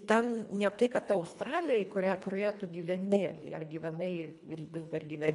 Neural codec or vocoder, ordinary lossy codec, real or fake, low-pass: codec, 24 kHz, 1.5 kbps, HILCodec; MP3, 64 kbps; fake; 10.8 kHz